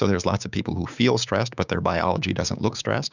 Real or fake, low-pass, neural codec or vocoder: fake; 7.2 kHz; codec, 16 kHz, 6 kbps, DAC